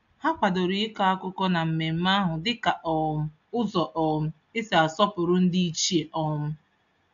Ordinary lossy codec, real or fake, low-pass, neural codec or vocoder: AAC, 64 kbps; real; 7.2 kHz; none